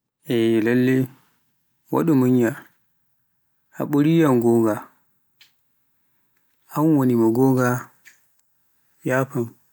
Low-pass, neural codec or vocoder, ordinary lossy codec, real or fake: none; none; none; real